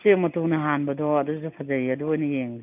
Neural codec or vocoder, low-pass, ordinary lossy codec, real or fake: none; 3.6 kHz; none; real